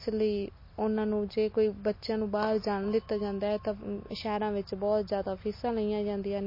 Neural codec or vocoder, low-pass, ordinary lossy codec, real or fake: none; 5.4 kHz; MP3, 24 kbps; real